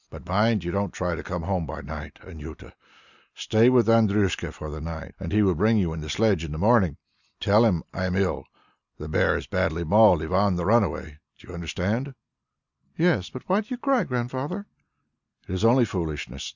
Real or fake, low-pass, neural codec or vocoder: real; 7.2 kHz; none